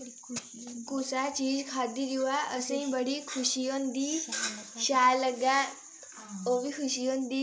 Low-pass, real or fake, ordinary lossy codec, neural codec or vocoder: none; real; none; none